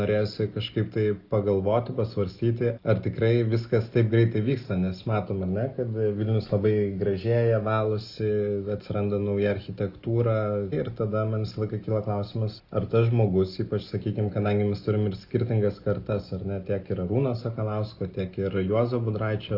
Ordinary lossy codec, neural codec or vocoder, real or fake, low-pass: Opus, 24 kbps; none; real; 5.4 kHz